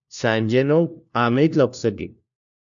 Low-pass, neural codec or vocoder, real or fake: 7.2 kHz; codec, 16 kHz, 1 kbps, FunCodec, trained on LibriTTS, 50 frames a second; fake